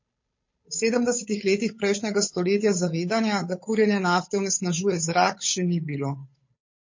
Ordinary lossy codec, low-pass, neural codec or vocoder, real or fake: MP3, 32 kbps; 7.2 kHz; codec, 16 kHz, 8 kbps, FunCodec, trained on Chinese and English, 25 frames a second; fake